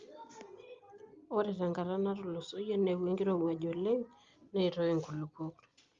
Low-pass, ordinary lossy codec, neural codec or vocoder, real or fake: 7.2 kHz; Opus, 32 kbps; none; real